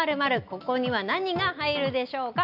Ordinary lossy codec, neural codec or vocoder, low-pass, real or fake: none; none; 5.4 kHz; real